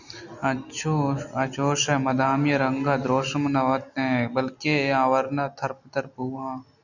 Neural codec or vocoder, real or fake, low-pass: none; real; 7.2 kHz